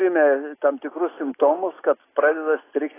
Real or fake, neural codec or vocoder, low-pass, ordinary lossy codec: real; none; 3.6 kHz; AAC, 16 kbps